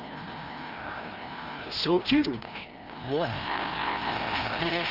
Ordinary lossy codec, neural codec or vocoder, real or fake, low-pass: Opus, 32 kbps; codec, 16 kHz, 1 kbps, FreqCodec, larger model; fake; 5.4 kHz